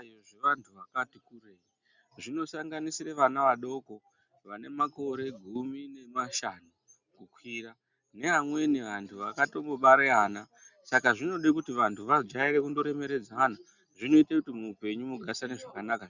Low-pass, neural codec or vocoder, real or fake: 7.2 kHz; none; real